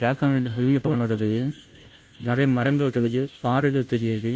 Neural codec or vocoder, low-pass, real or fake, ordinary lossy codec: codec, 16 kHz, 0.5 kbps, FunCodec, trained on Chinese and English, 25 frames a second; none; fake; none